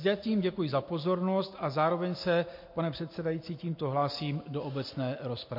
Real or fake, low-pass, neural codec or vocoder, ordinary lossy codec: real; 5.4 kHz; none; MP3, 32 kbps